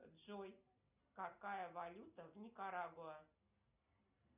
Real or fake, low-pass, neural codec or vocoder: real; 3.6 kHz; none